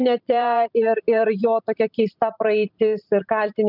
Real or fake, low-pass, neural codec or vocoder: fake; 5.4 kHz; vocoder, 44.1 kHz, 128 mel bands every 512 samples, BigVGAN v2